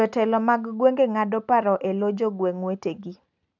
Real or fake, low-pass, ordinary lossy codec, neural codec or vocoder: real; 7.2 kHz; none; none